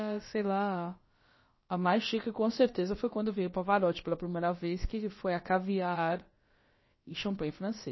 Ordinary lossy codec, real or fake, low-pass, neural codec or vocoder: MP3, 24 kbps; fake; 7.2 kHz; codec, 16 kHz, about 1 kbps, DyCAST, with the encoder's durations